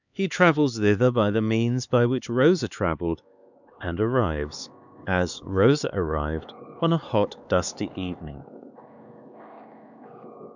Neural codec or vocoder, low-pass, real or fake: codec, 16 kHz, 4 kbps, X-Codec, HuBERT features, trained on LibriSpeech; 7.2 kHz; fake